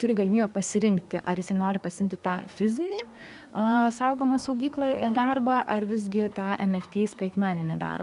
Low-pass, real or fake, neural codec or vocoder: 10.8 kHz; fake; codec, 24 kHz, 1 kbps, SNAC